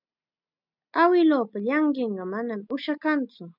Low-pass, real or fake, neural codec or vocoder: 5.4 kHz; real; none